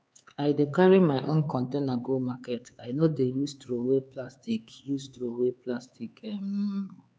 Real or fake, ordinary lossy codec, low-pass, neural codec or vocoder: fake; none; none; codec, 16 kHz, 4 kbps, X-Codec, HuBERT features, trained on LibriSpeech